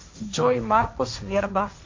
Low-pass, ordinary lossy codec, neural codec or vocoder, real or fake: 7.2 kHz; MP3, 48 kbps; codec, 16 kHz, 1.1 kbps, Voila-Tokenizer; fake